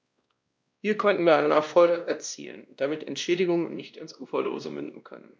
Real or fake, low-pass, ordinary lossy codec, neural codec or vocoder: fake; none; none; codec, 16 kHz, 1 kbps, X-Codec, WavLM features, trained on Multilingual LibriSpeech